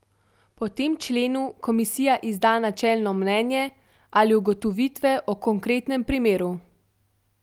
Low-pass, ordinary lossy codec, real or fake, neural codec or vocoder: 19.8 kHz; Opus, 32 kbps; real; none